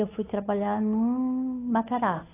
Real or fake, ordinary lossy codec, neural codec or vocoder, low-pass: fake; AAC, 16 kbps; codec, 16 kHz, 8 kbps, FunCodec, trained on Chinese and English, 25 frames a second; 3.6 kHz